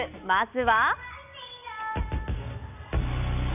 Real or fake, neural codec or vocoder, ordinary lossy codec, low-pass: real; none; none; 3.6 kHz